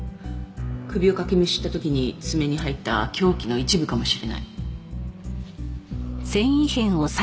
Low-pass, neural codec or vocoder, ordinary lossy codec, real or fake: none; none; none; real